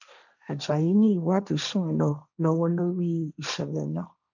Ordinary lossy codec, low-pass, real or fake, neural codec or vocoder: none; 7.2 kHz; fake; codec, 16 kHz, 1.1 kbps, Voila-Tokenizer